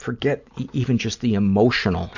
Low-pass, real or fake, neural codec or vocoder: 7.2 kHz; real; none